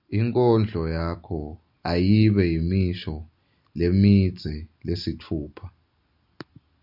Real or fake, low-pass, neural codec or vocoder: real; 5.4 kHz; none